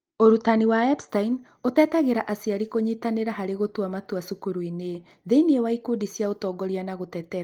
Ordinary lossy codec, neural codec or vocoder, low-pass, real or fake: Opus, 24 kbps; none; 19.8 kHz; real